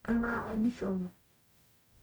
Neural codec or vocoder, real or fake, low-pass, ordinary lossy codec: codec, 44.1 kHz, 0.9 kbps, DAC; fake; none; none